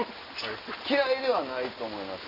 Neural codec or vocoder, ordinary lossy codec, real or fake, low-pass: none; none; real; 5.4 kHz